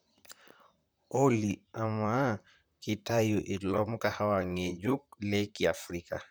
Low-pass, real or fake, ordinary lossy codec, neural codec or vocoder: none; fake; none; vocoder, 44.1 kHz, 128 mel bands, Pupu-Vocoder